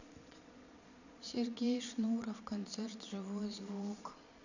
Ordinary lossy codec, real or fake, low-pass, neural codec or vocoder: none; fake; 7.2 kHz; vocoder, 22.05 kHz, 80 mel bands, Vocos